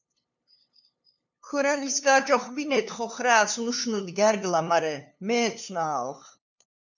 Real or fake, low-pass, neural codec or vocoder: fake; 7.2 kHz; codec, 16 kHz, 2 kbps, FunCodec, trained on LibriTTS, 25 frames a second